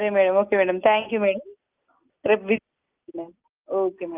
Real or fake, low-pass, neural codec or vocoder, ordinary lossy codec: real; 3.6 kHz; none; Opus, 32 kbps